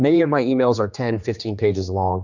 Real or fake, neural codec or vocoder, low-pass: fake; codec, 16 kHz, 2 kbps, X-Codec, HuBERT features, trained on general audio; 7.2 kHz